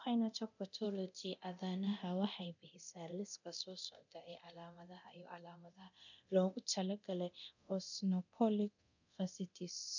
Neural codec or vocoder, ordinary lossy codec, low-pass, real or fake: codec, 24 kHz, 0.9 kbps, DualCodec; none; 7.2 kHz; fake